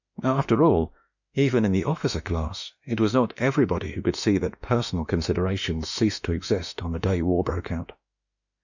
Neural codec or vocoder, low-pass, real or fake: autoencoder, 48 kHz, 32 numbers a frame, DAC-VAE, trained on Japanese speech; 7.2 kHz; fake